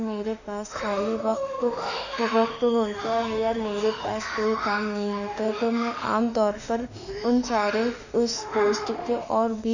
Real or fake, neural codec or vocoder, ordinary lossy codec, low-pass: fake; autoencoder, 48 kHz, 32 numbers a frame, DAC-VAE, trained on Japanese speech; none; 7.2 kHz